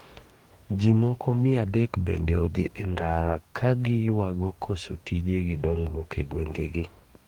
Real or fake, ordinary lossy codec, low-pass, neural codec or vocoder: fake; Opus, 24 kbps; 19.8 kHz; codec, 44.1 kHz, 2.6 kbps, DAC